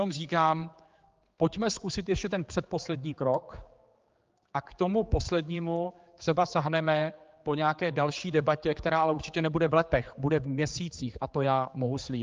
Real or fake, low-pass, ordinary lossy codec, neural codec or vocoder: fake; 7.2 kHz; Opus, 32 kbps; codec, 16 kHz, 4 kbps, X-Codec, HuBERT features, trained on general audio